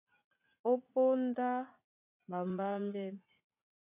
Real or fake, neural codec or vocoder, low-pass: fake; vocoder, 44.1 kHz, 80 mel bands, Vocos; 3.6 kHz